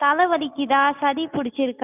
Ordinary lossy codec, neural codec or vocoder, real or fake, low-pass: none; none; real; 3.6 kHz